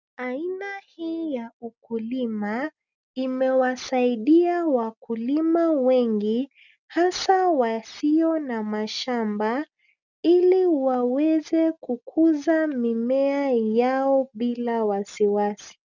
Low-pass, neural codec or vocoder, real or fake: 7.2 kHz; none; real